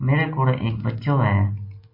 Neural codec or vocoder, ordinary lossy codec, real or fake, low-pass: none; MP3, 24 kbps; real; 5.4 kHz